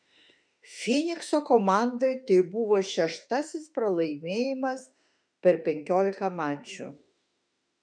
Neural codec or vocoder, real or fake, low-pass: autoencoder, 48 kHz, 32 numbers a frame, DAC-VAE, trained on Japanese speech; fake; 9.9 kHz